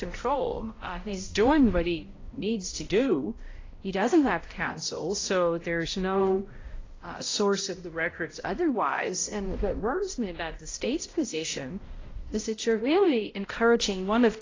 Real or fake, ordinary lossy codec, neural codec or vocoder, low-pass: fake; AAC, 32 kbps; codec, 16 kHz, 0.5 kbps, X-Codec, HuBERT features, trained on balanced general audio; 7.2 kHz